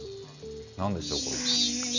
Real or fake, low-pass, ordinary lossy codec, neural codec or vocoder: real; 7.2 kHz; none; none